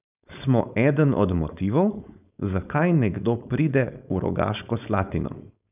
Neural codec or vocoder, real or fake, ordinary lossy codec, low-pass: codec, 16 kHz, 4.8 kbps, FACodec; fake; none; 3.6 kHz